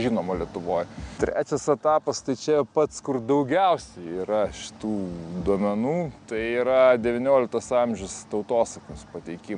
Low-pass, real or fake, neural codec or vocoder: 9.9 kHz; real; none